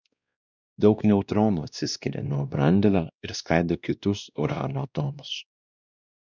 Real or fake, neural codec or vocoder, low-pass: fake; codec, 16 kHz, 1 kbps, X-Codec, WavLM features, trained on Multilingual LibriSpeech; 7.2 kHz